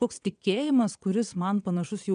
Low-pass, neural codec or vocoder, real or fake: 9.9 kHz; vocoder, 22.05 kHz, 80 mel bands, WaveNeXt; fake